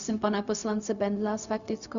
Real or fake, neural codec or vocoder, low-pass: fake; codec, 16 kHz, 0.4 kbps, LongCat-Audio-Codec; 7.2 kHz